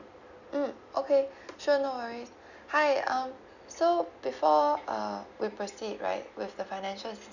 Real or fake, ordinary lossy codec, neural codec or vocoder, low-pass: real; none; none; 7.2 kHz